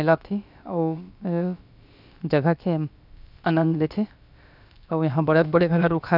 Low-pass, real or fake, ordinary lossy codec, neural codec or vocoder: 5.4 kHz; fake; none; codec, 16 kHz, about 1 kbps, DyCAST, with the encoder's durations